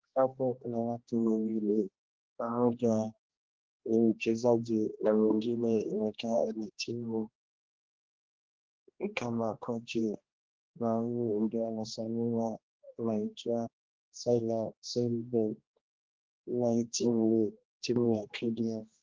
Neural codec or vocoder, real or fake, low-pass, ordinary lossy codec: codec, 16 kHz, 1 kbps, X-Codec, HuBERT features, trained on general audio; fake; 7.2 kHz; Opus, 16 kbps